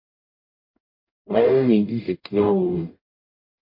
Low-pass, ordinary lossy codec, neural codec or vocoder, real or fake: 5.4 kHz; MP3, 32 kbps; codec, 44.1 kHz, 0.9 kbps, DAC; fake